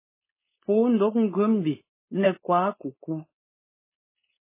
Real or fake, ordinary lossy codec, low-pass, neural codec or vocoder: fake; MP3, 16 kbps; 3.6 kHz; codec, 16 kHz, 4.8 kbps, FACodec